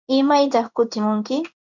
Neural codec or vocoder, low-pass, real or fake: codec, 16 kHz in and 24 kHz out, 1 kbps, XY-Tokenizer; 7.2 kHz; fake